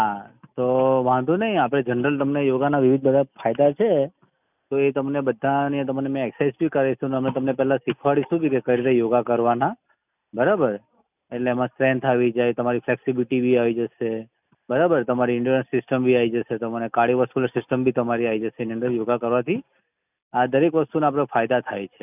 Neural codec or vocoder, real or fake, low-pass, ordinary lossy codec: none; real; 3.6 kHz; none